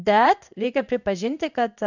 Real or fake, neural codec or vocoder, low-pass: fake; codec, 16 kHz in and 24 kHz out, 1 kbps, XY-Tokenizer; 7.2 kHz